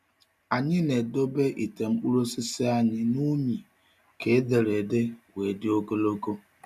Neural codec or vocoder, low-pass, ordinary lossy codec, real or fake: none; 14.4 kHz; none; real